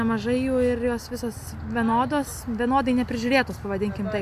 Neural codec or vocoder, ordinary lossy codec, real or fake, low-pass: none; AAC, 96 kbps; real; 14.4 kHz